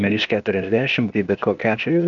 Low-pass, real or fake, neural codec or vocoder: 7.2 kHz; fake; codec, 16 kHz, 0.8 kbps, ZipCodec